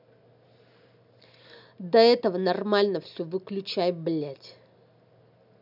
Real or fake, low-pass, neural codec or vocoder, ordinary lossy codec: real; 5.4 kHz; none; none